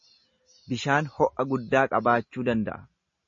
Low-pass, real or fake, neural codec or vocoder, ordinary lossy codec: 7.2 kHz; real; none; MP3, 32 kbps